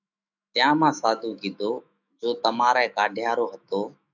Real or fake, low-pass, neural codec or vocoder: fake; 7.2 kHz; autoencoder, 48 kHz, 128 numbers a frame, DAC-VAE, trained on Japanese speech